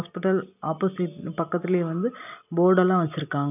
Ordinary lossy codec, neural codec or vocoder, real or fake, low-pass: none; none; real; 3.6 kHz